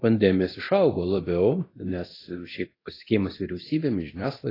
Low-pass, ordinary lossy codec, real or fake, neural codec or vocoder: 5.4 kHz; AAC, 24 kbps; fake; codec, 24 kHz, 0.9 kbps, DualCodec